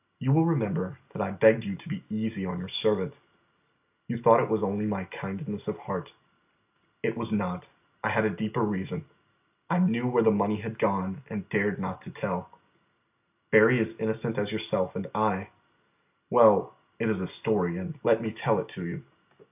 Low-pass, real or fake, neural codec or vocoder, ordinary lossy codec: 3.6 kHz; real; none; AAC, 32 kbps